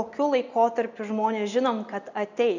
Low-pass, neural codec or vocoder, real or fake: 7.2 kHz; none; real